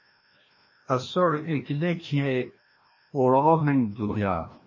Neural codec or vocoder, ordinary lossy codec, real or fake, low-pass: codec, 16 kHz, 1 kbps, FreqCodec, larger model; MP3, 32 kbps; fake; 7.2 kHz